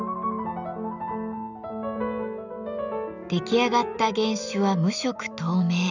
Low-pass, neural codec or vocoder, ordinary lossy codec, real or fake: 7.2 kHz; none; none; real